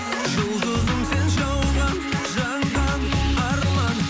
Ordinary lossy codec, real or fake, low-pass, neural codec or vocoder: none; real; none; none